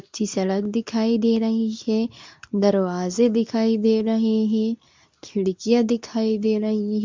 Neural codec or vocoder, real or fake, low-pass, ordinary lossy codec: codec, 24 kHz, 0.9 kbps, WavTokenizer, medium speech release version 2; fake; 7.2 kHz; MP3, 64 kbps